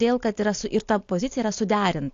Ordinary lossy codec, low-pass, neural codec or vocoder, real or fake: AAC, 48 kbps; 7.2 kHz; none; real